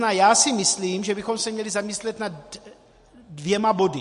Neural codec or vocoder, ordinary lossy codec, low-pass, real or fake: none; MP3, 48 kbps; 14.4 kHz; real